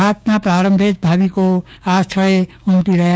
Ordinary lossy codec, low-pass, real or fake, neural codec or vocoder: none; none; fake; codec, 16 kHz, 6 kbps, DAC